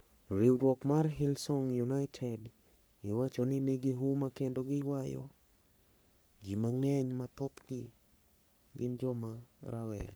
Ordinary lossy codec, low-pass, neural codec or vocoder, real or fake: none; none; codec, 44.1 kHz, 3.4 kbps, Pupu-Codec; fake